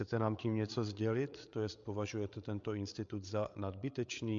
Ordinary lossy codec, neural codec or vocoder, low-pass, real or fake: MP3, 64 kbps; codec, 16 kHz, 8 kbps, FreqCodec, larger model; 7.2 kHz; fake